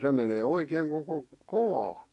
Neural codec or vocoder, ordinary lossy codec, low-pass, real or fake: codec, 44.1 kHz, 2.6 kbps, SNAC; AAC, 48 kbps; 10.8 kHz; fake